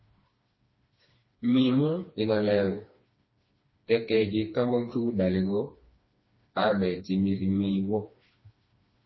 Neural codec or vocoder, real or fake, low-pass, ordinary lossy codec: codec, 16 kHz, 2 kbps, FreqCodec, smaller model; fake; 7.2 kHz; MP3, 24 kbps